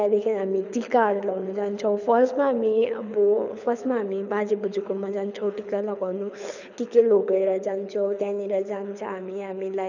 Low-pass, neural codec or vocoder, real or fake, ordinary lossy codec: 7.2 kHz; codec, 24 kHz, 6 kbps, HILCodec; fake; none